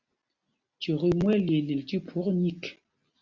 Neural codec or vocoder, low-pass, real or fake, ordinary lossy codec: none; 7.2 kHz; real; Opus, 64 kbps